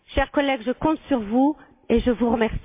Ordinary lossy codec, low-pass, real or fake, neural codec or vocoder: MP3, 24 kbps; 3.6 kHz; real; none